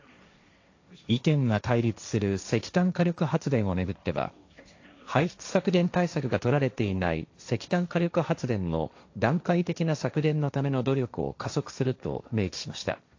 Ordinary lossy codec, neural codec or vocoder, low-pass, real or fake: AAC, 48 kbps; codec, 16 kHz, 1.1 kbps, Voila-Tokenizer; 7.2 kHz; fake